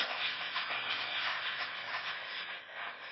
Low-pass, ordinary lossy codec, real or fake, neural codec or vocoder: 7.2 kHz; MP3, 24 kbps; fake; autoencoder, 22.05 kHz, a latent of 192 numbers a frame, VITS, trained on one speaker